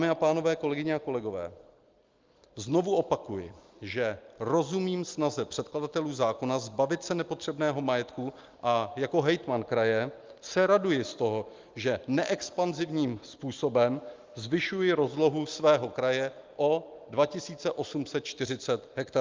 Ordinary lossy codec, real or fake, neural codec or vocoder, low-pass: Opus, 32 kbps; real; none; 7.2 kHz